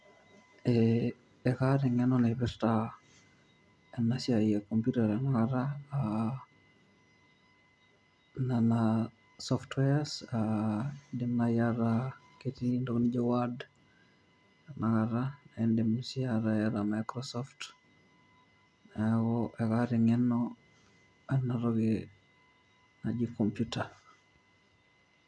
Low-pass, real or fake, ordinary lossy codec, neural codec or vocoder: none; real; none; none